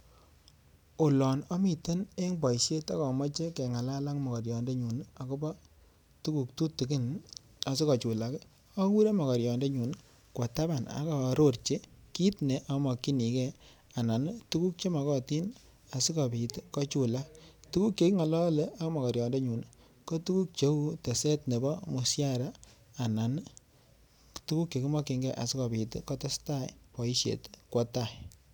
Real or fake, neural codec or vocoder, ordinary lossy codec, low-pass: real; none; none; none